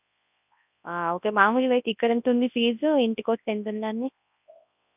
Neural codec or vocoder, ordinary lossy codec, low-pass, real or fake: codec, 24 kHz, 0.9 kbps, WavTokenizer, large speech release; none; 3.6 kHz; fake